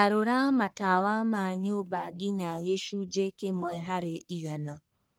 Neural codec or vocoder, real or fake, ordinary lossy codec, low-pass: codec, 44.1 kHz, 1.7 kbps, Pupu-Codec; fake; none; none